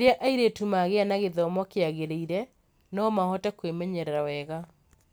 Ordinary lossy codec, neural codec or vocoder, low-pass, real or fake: none; none; none; real